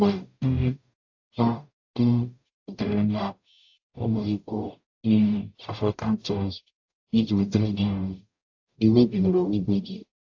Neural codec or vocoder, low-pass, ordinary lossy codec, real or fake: codec, 44.1 kHz, 0.9 kbps, DAC; 7.2 kHz; AAC, 48 kbps; fake